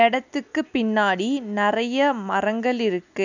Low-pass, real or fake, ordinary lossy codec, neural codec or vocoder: 7.2 kHz; real; none; none